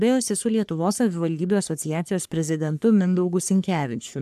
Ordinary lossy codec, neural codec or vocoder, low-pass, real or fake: AAC, 96 kbps; codec, 44.1 kHz, 3.4 kbps, Pupu-Codec; 14.4 kHz; fake